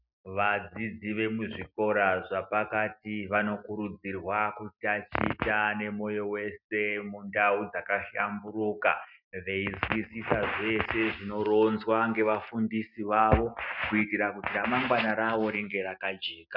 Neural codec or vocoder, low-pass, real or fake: none; 5.4 kHz; real